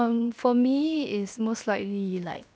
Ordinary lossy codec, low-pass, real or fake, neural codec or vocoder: none; none; fake; codec, 16 kHz, 0.7 kbps, FocalCodec